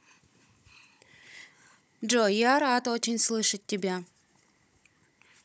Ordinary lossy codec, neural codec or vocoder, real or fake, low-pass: none; codec, 16 kHz, 16 kbps, FunCodec, trained on Chinese and English, 50 frames a second; fake; none